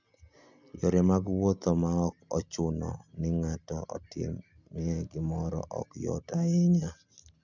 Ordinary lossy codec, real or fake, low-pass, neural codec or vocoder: none; real; 7.2 kHz; none